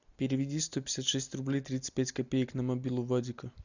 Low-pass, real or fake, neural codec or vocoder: 7.2 kHz; real; none